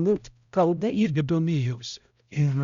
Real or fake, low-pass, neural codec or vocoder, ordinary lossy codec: fake; 7.2 kHz; codec, 16 kHz, 0.5 kbps, X-Codec, HuBERT features, trained on balanced general audio; Opus, 64 kbps